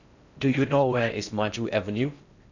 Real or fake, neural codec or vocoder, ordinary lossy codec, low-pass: fake; codec, 16 kHz in and 24 kHz out, 0.6 kbps, FocalCodec, streaming, 4096 codes; none; 7.2 kHz